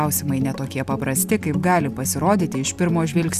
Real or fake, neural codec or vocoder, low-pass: real; none; 14.4 kHz